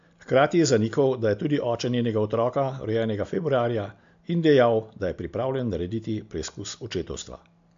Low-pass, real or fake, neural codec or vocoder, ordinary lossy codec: 7.2 kHz; real; none; AAC, 64 kbps